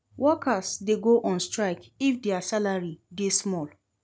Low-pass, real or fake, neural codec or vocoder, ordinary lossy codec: none; real; none; none